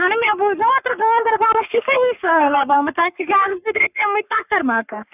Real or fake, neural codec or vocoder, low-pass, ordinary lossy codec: fake; codec, 44.1 kHz, 3.4 kbps, Pupu-Codec; 3.6 kHz; none